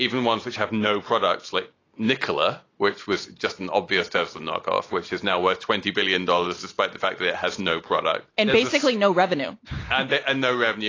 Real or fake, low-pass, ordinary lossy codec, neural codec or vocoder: real; 7.2 kHz; AAC, 32 kbps; none